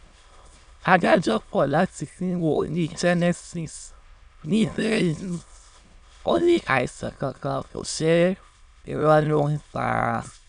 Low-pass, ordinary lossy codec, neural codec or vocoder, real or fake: 9.9 kHz; none; autoencoder, 22.05 kHz, a latent of 192 numbers a frame, VITS, trained on many speakers; fake